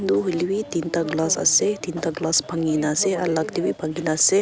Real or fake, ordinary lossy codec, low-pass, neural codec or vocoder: real; none; none; none